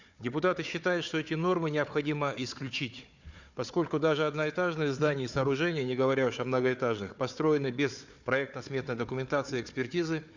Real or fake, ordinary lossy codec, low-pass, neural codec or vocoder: fake; none; 7.2 kHz; codec, 16 kHz, 4 kbps, FunCodec, trained on Chinese and English, 50 frames a second